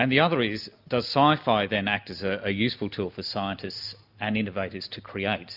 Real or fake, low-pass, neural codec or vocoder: real; 5.4 kHz; none